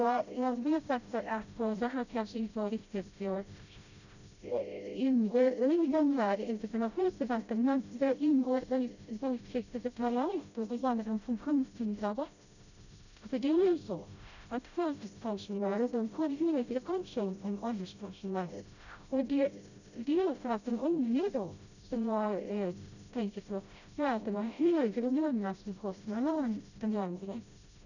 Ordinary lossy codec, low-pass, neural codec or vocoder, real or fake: none; 7.2 kHz; codec, 16 kHz, 0.5 kbps, FreqCodec, smaller model; fake